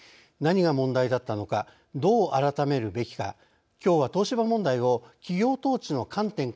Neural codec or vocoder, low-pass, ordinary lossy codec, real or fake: none; none; none; real